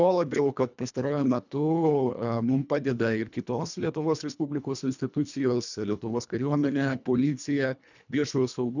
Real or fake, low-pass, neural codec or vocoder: fake; 7.2 kHz; codec, 24 kHz, 1.5 kbps, HILCodec